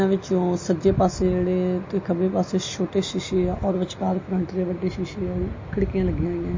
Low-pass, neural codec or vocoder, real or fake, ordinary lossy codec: 7.2 kHz; none; real; MP3, 32 kbps